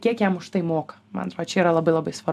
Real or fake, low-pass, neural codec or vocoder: real; 14.4 kHz; none